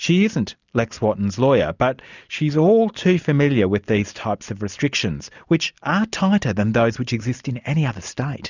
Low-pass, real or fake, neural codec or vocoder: 7.2 kHz; real; none